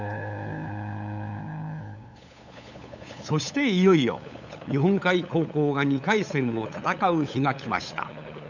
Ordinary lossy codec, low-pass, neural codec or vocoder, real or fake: none; 7.2 kHz; codec, 16 kHz, 8 kbps, FunCodec, trained on LibriTTS, 25 frames a second; fake